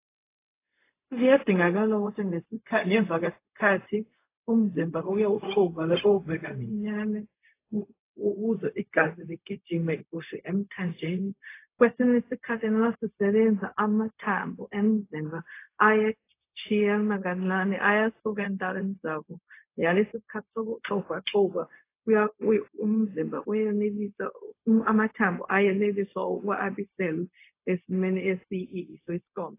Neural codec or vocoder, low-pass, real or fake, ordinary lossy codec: codec, 16 kHz, 0.4 kbps, LongCat-Audio-Codec; 3.6 kHz; fake; AAC, 24 kbps